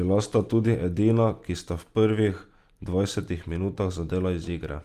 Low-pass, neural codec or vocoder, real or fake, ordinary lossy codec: 14.4 kHz; none; real; Opus, 32 kbps